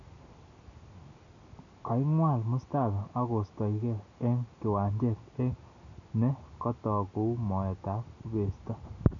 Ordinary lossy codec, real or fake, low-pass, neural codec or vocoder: none; real; 7.2 kHz; none